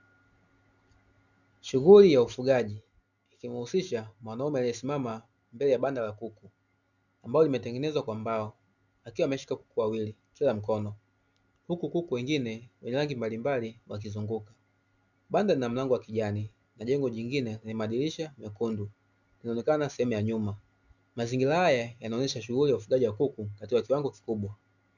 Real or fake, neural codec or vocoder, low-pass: real; none; 7.2 kHz